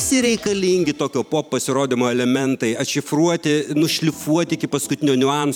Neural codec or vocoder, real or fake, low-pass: vocoder, 44.1 kHz, 128 mel bands every 512 samples, BigVGAN v2; fake; 19.8 kHz